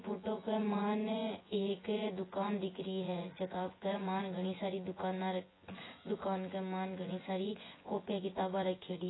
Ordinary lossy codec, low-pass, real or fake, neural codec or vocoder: AAC, 16 kbps; 7.2 kHz; fake; vocoder, 24 kHz, 100 mel bands, Vocos